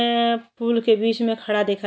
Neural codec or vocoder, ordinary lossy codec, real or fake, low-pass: none; none; real; none